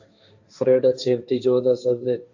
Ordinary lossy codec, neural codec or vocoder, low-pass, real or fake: AAC, 48 kbps; codec, 16 kHz, 1.1 kbps, Voila-Tokenizer; 7.2 kHz; fake